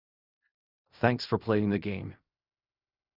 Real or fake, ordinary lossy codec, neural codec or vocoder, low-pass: fake; none; codec, 16 kHz in and 24 kHz out, 0.4 kbps, LongCat-Audio-Codec, fine tuned four codebook decoder; 5.4 kHz